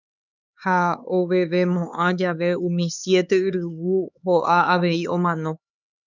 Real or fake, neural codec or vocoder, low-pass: fake; codec, 16 kHz, 4 kbps, X-Codec, HuBERT features, trained on LibriSpeech; 7.2 kHz